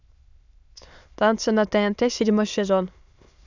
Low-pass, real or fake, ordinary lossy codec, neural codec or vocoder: 7.2 kHz; fake; none; autoencoder, 22.05 kHz, a latent of 192 numbers a frame, VITS, trained on many speakers